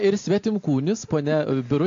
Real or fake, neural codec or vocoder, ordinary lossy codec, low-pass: real; none; AAC, 48 kbps; 7.2 kHz